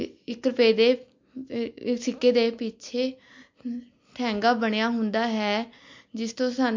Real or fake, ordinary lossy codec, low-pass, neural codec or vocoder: real; MP3, 48 kbps; 7.2 kHz; none